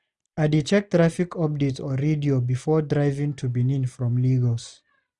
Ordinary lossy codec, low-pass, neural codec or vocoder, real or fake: none; 10.8 kHz; none; real